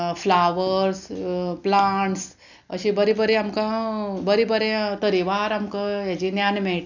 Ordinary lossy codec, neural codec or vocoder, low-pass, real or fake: none; none; 7.2 kHz; real